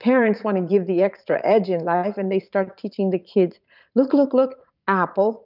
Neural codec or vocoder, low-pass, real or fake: vocoder, 44.1 kHz, 80 mel bands, Vocos; 5.4 kHz; fake